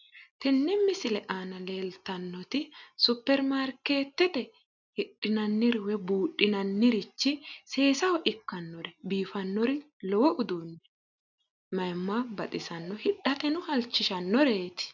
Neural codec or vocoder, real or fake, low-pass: none; real; 7.2 kHz